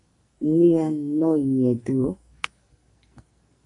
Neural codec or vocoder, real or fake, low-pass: codec, 44.1 kHz, 2.6 kbps, SNAC; fake; 10.8 kHz